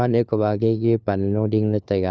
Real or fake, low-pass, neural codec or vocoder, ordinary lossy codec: fake; none; codec, 16 kHz, 4 kbps, FunCodec, trained on LibriTTS, 50 frames a second; none